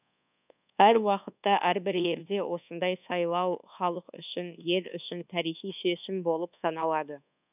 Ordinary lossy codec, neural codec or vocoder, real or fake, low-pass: none; codec, 24 kHz, 1.2 kbps, DualCodec; fake; 3.6 kHz